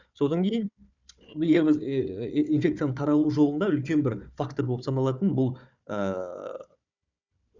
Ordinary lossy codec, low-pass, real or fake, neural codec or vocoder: none; 7.2 kHz; fake; codec, 16 kHz, 4 kbps, FunCodec, trained on Chinese and English, 50 frames a second